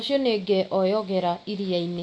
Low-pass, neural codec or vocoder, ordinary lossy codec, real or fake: none; none; none; real